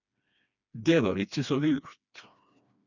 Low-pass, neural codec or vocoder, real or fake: 7.2 kHz; codec, 16 kHz, 2 kbps, FreqCodec, smaller model; fake